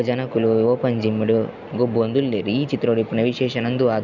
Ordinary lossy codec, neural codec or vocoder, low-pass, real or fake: none; none; 7.2 kHz; real